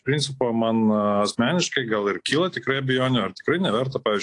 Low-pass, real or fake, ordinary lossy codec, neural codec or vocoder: 10.8 kHz; real; AAC, 48 kbps; none